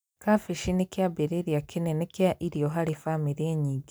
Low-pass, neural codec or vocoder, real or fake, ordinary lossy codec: none; none; real; none